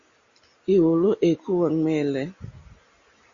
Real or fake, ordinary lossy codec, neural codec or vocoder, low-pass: real; Opus, 64 kbps; none; 7.2 kHz